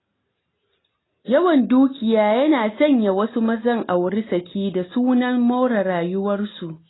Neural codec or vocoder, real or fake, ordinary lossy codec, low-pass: none; real; AAC, 16 kbps; 7.2 kHz